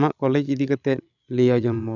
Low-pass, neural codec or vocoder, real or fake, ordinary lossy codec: 7.2 kHz; codec, 16 kHz, 16 kbps, FreqCodec, larger model; fake; none